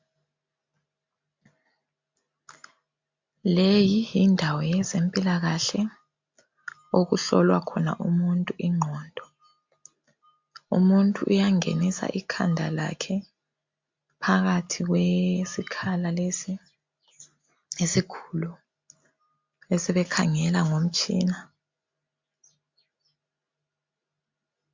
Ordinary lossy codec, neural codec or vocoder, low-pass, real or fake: MP3, 48 kbps; none; 7.2 kHz; real